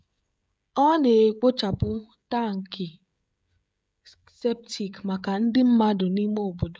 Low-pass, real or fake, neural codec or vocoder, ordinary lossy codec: none; fake; codec, 16 kHz, 16 kbps, FreqCodec, smaller model; none